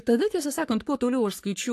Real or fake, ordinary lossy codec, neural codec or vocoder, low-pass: fake; AAC, 64 kbps; codec, 44.1 kHz, 3.4 kbps, Pupu-Codec; 14.4 kHz